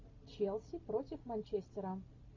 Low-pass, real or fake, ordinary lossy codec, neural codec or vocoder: 7.2 kHz; real; MP3, 48 kbps; none